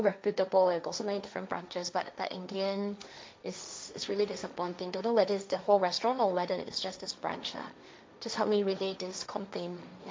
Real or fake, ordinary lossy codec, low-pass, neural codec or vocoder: fake; none; none; codec, 16 kHz, 1.1 kbps, Voila-Tokenizer